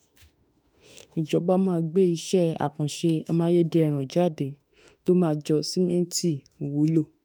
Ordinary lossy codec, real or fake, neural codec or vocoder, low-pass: none; fake; autoencoder, 48 kHz, 32 numbers a frame, DAC-VAE, trained on Japanese speech; none